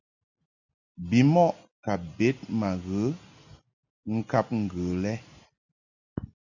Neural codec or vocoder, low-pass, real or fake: none; 7.2 kHz; real